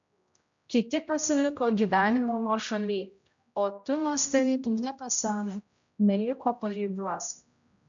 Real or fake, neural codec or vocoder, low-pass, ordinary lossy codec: fake; codec, 16 kHz, 0.5 kbps, X-Codec, HuBERT features, trained on general audio; 7.2 kHz; MP3, 64 kbps